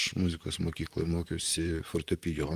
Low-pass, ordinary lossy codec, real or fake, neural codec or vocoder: 14.4 kHz; Opus, 32 kbps; fake; vocoder, 44.1 kHz, 128 mel bands, Pupu-Vocoder